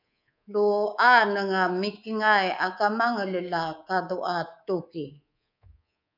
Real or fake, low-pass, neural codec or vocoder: fake; 5.4 kHz; codec, 24 kHz, 3.1 kbps, DualCodec